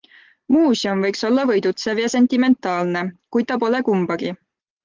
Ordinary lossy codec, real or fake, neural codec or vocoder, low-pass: Opus, 16 kbps; real; none; 7.2 kHz